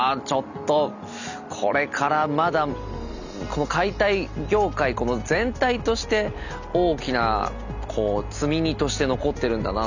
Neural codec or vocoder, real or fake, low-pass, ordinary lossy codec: none; real; 7.2 kHz; none